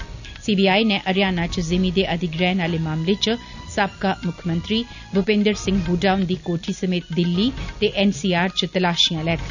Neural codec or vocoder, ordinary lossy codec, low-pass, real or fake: none; none; 7.2 kHz; real